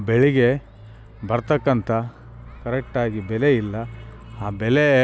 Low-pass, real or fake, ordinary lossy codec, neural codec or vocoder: none; real; none; none